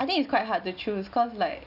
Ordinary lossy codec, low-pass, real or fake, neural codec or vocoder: none; 5.4 kHz; real; none